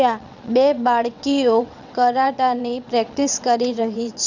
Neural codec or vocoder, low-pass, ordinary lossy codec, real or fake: vocoder, 22.05 kHz, 80 mel bands, WaveNeXt; 7.2 kHz; none; fake